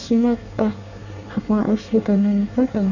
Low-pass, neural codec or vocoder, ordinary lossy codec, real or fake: 7.2 kHz; codec, 24 kHz, 1 kbps, SNAC; none; fake